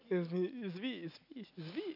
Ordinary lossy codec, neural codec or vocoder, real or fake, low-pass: none; none; real; 5.4 kHz